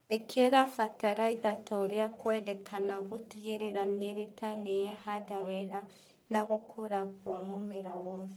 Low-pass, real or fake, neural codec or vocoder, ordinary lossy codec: none; fake; codec, 44.1 kHz, 1.7 kbps, Pupu-Codec; none